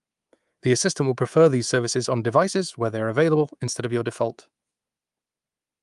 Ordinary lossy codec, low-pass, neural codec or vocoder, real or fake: Opus, 32 kbps; 10.8 kHz; codec, 24 kHz, 3.1 kbps, DualCodec; fake